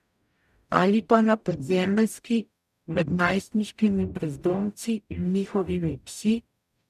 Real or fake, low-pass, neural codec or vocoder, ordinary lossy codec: fake; 14.4 kHz; codec, 44.1 kHz, 0.9 kbps, DAC; none